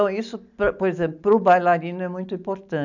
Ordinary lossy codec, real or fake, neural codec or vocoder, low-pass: none; real; none; 7.2 kHz